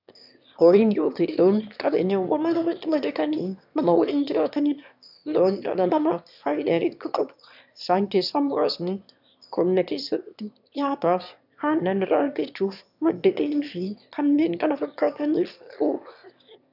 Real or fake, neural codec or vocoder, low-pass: fake; autoencoder, 22.05 kHz, a latent of 192 numbers a frame, VITS, trained on one speaker; 5.4 kHz